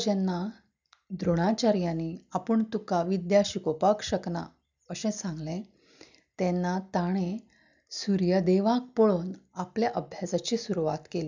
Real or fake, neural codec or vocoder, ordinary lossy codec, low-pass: real; none; none; 7.2 kHz